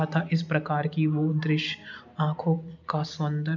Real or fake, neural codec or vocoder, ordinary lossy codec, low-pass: real; none; none; 7.2 kHz